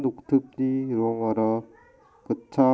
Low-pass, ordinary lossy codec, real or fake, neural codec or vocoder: none; none; real; none